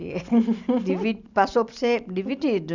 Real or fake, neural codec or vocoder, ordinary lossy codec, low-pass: real; none; none; 7.2 kHz